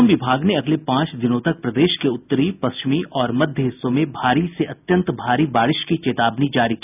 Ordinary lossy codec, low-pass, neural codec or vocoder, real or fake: none; 3.6 kHz; none; real